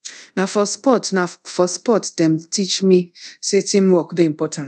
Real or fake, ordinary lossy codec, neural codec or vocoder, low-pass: fake; none; codec, 24 kHz, 0.5 kbps, DualCodec; 10.8 kHz